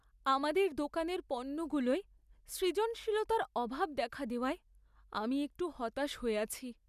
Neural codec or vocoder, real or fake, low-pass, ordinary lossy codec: none; real; 14.4 kHz; none